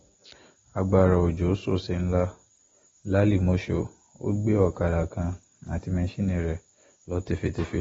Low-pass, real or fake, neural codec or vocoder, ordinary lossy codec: 7.2 kHz; real; none; AAC, 24 kbps